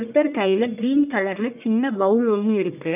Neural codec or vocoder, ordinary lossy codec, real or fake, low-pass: codec, 44.1 kHz, 1.7 kbps, Pupu-Codec; none; fake; 3.6 kHz